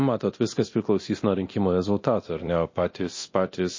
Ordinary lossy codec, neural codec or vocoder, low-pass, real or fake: MP3, 32 kbps; codec, 24 kHz, 0.9 kbps, DualCodec; 7.2 kHz; fake